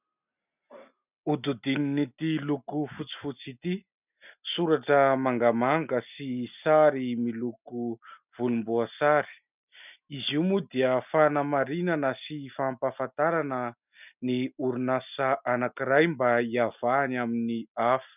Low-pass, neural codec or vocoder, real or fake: 3.6 kHz; none; real